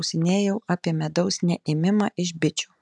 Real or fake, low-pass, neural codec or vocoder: real; 10.8 kHz; none